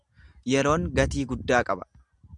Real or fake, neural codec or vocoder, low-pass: real; none; 10.8 kHz